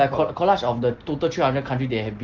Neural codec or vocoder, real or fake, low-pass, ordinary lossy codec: none; real; 7.2 kHz; Opus, 16 kbps